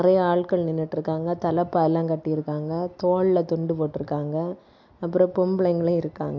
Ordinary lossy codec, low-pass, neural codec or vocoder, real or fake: MP3, 48 kbps; 7.2 kHz; none; real